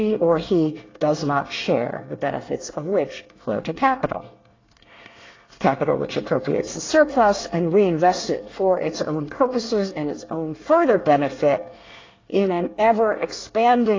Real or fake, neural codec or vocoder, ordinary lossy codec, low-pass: fake; codec, 24 kHz, 1 kbps, SNAC; AAC, 32 kbps; 7.2 kHz